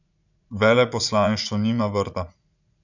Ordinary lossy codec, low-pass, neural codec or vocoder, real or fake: none; 7.2 kHz; vocoder, 44.1 kHz, 80 mel bands, Vocos; fake